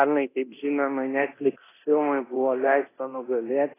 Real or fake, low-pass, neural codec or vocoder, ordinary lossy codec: fake; 3.6 kHz; codec, 24 kHz, 0.9 kbps, DualCodec; AAC, 16 kbps